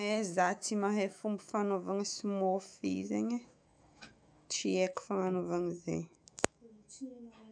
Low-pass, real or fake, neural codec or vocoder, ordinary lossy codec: 9.9 kHz; fake; autoencoder, 48 kHz, 128 numbers a frame, DAC-VAE, trained on Japanese speech; none